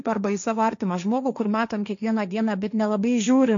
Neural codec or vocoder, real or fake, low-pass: codec, 16 kHz, 1.1 kbps, Voila-Tokenizer; fake; 7.2 kHz